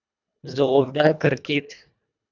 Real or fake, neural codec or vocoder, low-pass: fake; codec, 24 kHz, 1.5 kbps, HILCodec; 7.2 kHz